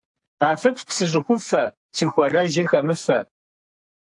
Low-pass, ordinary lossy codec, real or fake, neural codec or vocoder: 10.8 kHz; AAC, 64 kbps; fake; codec, 44.1 kHz, 2.6 kbps, SNAC